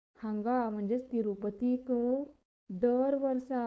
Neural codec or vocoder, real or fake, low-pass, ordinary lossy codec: codec, 16 kHz, 4.8 kbps, FACodec; fake; none; none